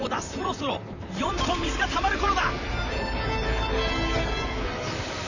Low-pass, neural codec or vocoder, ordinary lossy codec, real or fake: 7.2 kHz; vocoder, 44.1 kHz, 128 mel bands, Pupu-Vocoder; none; fake